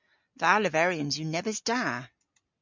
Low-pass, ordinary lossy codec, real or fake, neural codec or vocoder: 7.2 kHz; MP3, 64 kbps; real; none